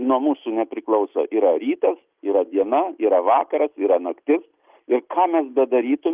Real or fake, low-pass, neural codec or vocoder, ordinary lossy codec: real; 3.6 kHz; none; Opus, 24 kbps